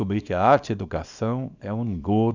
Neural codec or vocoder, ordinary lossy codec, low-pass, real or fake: codec, 24 kHz, 0.9 kbps, WavTokenizer, small release; none; 7.2 kHz; fake